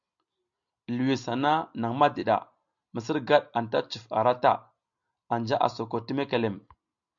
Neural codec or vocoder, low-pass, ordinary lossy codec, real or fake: none; 7.2 kHz; MP3, 96 kbps; real